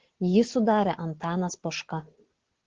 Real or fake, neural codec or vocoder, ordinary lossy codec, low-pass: real; none; Opus, 16 kbps; 7.2 kHz